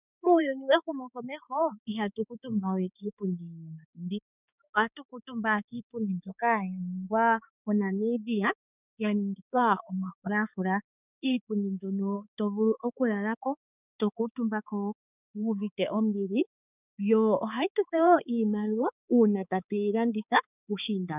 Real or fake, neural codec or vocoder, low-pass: fake; codec, 16 kHz, 4 kbps, X-Codec, HuBERT features, trained on balanced general audio; 3.6 kHz